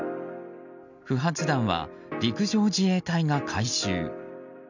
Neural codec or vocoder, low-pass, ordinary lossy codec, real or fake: none; 7.2 kHz; none; real